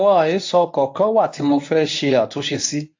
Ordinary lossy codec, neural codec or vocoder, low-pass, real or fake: AAC, 48 kbps; codec, 24 kHz, 0.9 kbps, WavTokenizer, medium speech release version 1; 7.2 kHz; fake